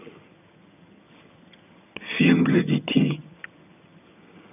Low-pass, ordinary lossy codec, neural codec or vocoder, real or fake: 3.6 kHz; none; vocoder, 22.05 kHz, 80 mel bands, HiFi-GAN; fake